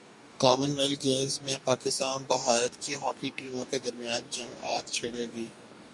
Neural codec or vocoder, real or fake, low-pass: codec, 44.1 kHz, 2.6 kbps, DAC; fake; 10.8 kHz